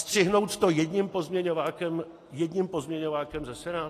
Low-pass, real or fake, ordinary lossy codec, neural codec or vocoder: 14.4 kHz; real; AAC, 48 kbps; none